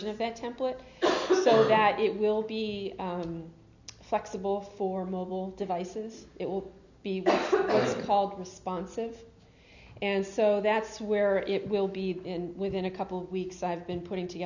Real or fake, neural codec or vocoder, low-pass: real; none; 7.2 kHz